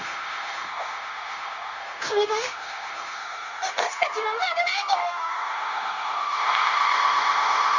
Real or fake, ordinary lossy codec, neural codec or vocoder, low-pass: fake; none; codec, 16 kHz, 0.9 kbps, LongCat-Audio-Codec; 7.2 kHz